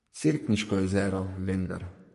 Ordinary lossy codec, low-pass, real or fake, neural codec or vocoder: MP3, 48 kbps; 14.4 kHz; fake; codec, 44.1 kHz, 3.4 kbps, Pupu-Codec